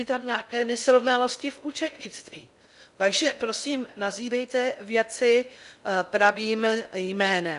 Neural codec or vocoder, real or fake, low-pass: codec, 16 kHz in and 24 kHz out, 0.6 kbps, FocalCodec, streaming, 4096 codes; fake; 10.8 kHz